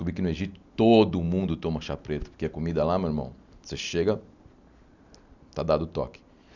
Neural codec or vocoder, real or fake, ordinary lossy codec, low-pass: none; real; none; 7.2 kHz